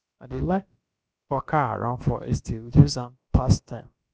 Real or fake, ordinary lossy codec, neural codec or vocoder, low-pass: fake; none; codec, 16 kHz, about 1 kbps, DyCAST, with the encoder's durations; none